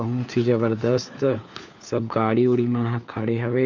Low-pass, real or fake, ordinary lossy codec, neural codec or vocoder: 7.2 kHz; fake; MP3, 64 kbps; codec, 16 kHz, 4 kbps, FunCodec, trained on LibriTTS, 50 frames a second